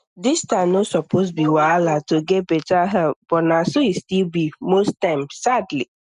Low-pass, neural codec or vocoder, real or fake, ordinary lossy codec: 10.8 kHz; none; real; none